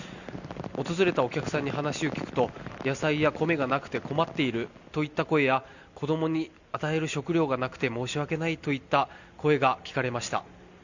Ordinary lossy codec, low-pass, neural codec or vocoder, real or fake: none; 7.2 kHz; none; real